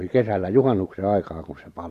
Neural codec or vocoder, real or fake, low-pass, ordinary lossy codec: none; real; 14.4 kHz; AAC, 64 kbps